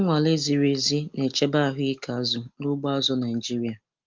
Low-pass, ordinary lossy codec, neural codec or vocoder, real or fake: 7.2 kHz; Opus, 32 kbps; none; real